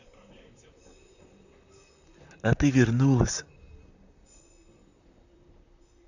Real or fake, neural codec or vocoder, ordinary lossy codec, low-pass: fake; vocoder, 44.1 kHz, 128 mel bands, Pupu-Vocoder; none; 7.2 kHz